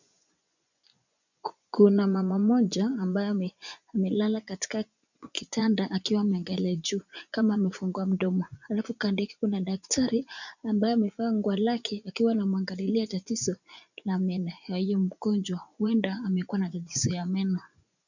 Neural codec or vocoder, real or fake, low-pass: vocoder, 24 kHz, 100 mel bands, Vocos; fake; 7.2 kHz